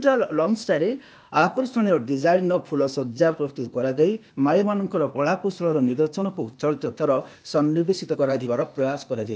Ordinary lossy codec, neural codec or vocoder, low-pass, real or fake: none; codec, 16 kHz, 0.8 kbps, ZipCodec; none; fake